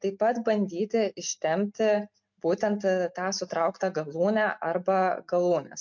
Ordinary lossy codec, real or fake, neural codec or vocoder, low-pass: MP3, 48 kbps; real; none; 7.2 kHz